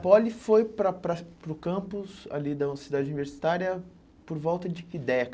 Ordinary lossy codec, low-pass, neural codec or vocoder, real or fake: none; none; none; real